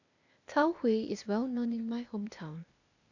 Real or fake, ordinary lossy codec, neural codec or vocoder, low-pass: fake; none; codec, 16 kHz, 0.8 kbps, ZipCodec; 7.2 kHz